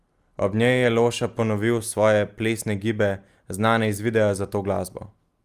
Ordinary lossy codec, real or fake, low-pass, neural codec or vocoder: Opus, 32 kbps; real; 14.4 kHz; none